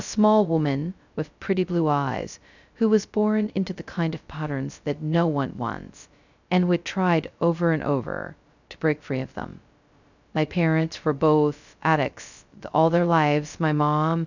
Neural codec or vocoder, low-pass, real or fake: codec, 16 kHz, 0.2 kbps, FocalCodec; 7.2 kHz; fake